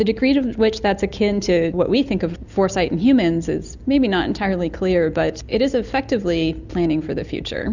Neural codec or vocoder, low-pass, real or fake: none; 7.2 kHz; real